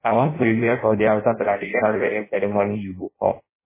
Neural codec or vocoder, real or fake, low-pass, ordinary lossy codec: codec, 16 kHz in and 24 kHz out, 0.6 kbps, FireRedTTS-2 codec; fake; 3.6 kHz; MP3, 16 kbps